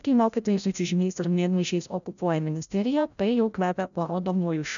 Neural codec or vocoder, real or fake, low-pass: codec, 16 kHz, 0.5 kbps, FreqCodec, larger model; fake; 7.2 kHz